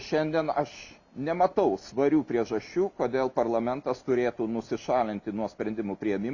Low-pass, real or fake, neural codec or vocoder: 7.2 kHz; real; none